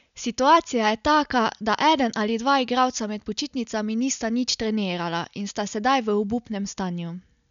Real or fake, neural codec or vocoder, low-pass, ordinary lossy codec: real; none; 7.2 kHz; none